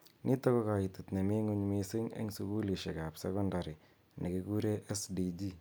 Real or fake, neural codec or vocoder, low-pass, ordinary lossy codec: real; none; none; none